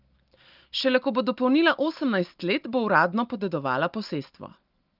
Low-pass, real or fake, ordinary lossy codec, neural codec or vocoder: 5.4 kHz; real; Opus, 32 kbps; none